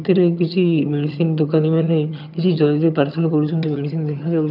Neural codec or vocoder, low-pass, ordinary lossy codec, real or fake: vocoder, 22.05 kHz, 80 mel bands, HiFi-GAN; 5.4 kHz; none; fake